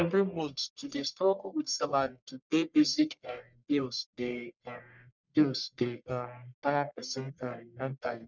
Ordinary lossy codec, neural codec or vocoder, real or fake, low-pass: none; codec, 44.1 kHz, 1.7 kbps, Pupu-Codec; fake; 7.2 kHz